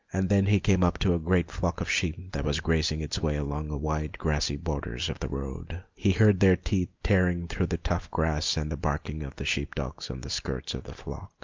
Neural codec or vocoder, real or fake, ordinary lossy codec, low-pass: none; real; Opus, 24 kbps; 7.2 kHz